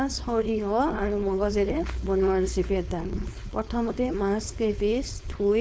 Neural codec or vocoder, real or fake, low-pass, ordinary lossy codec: codec, 16 kHz, 4.8 kbps, FACodec; fake; none; none